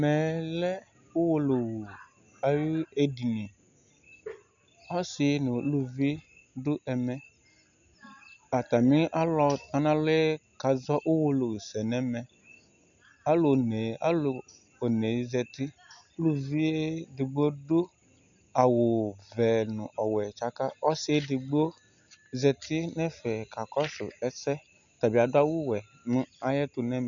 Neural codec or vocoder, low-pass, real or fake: none; 7.2 kHz; real